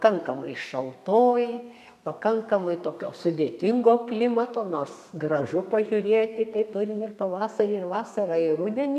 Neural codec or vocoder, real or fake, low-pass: codec, 32 kHz, 1.9 kbps, SNAC; fake; 14.4 kHz